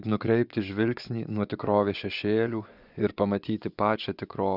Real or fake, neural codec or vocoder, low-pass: fake; vocoder, 24 kHz, 100 mel bands, Vocos; 5.4 kHz